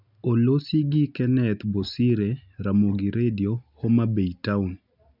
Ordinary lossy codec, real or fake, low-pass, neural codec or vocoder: none; real; 5.4 kHz; none